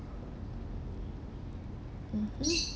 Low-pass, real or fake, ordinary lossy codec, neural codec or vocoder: none; real; none; none